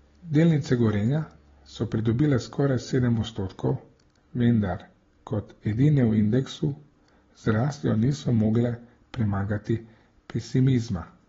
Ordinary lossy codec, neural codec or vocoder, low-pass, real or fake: AAC, 24 kbps; none; 7.2 kHz; real